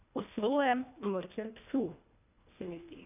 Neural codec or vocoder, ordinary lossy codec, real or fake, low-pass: codec, 24 kHz, 1.5 kbps, HILCodec; none; fake; 3.6 kHz